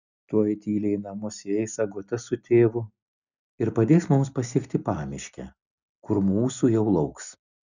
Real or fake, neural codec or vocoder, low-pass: real; none; 7.2 kHz